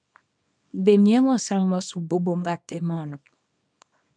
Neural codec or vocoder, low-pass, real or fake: codec, 24 kHz, 0.9 kbps, WavTokenizer, small release; 9.9 kHz; fake